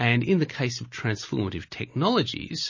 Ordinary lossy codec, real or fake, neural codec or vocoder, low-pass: MP3, 32 kbps; real; none; 7.2 kHz